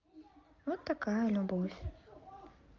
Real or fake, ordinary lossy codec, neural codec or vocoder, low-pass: real; Opus, 32 kbps; none; 7.2 kHz